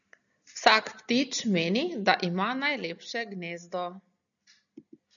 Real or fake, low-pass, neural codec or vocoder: real; 7.2 kHz; none